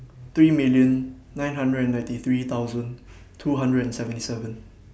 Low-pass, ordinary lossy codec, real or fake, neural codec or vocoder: none; none; real; none